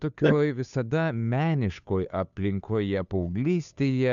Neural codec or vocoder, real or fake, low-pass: codec, 16 kHz, 2 kbps, FunCodec, trained on Chinese and English, 25 frames a second; fake; 7.2 kHz